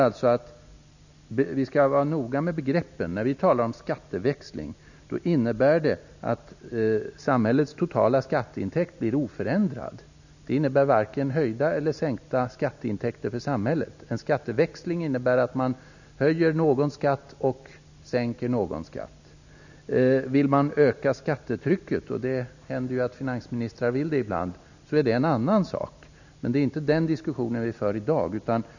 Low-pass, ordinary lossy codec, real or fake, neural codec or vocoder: 7.2 kHz; none; real; none